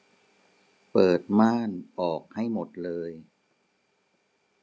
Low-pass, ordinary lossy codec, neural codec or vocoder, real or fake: none; none; none; real